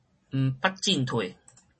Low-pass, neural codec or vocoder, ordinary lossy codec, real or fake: 10.8 kHz; none; MP3, 32 kbps; real